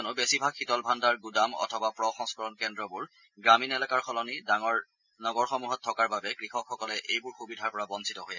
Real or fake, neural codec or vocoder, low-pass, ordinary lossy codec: real; none; 7.2 kHz; none